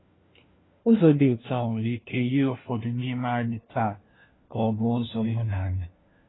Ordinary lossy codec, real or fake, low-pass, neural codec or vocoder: AAC, 16 kbps; fake; 7.2 kHz; codec, 16 kHz, 1 kbps, FunCodec, trained on LibriTTS, 50 frames a second